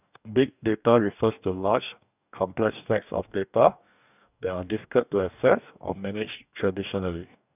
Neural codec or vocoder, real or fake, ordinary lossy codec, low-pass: codec, 44.1 kHz, 2.6 kbps, DAC; fake; none; 3.6 kHz